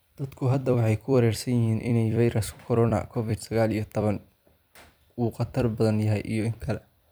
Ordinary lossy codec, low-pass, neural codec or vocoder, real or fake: none; none; vocoder, 44.1 kHz, 128 mel bands every 256 samples, BigVGAN v2; fake